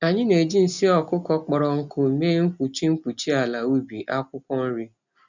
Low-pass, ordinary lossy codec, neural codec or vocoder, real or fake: 7.2 kHz; none; none; real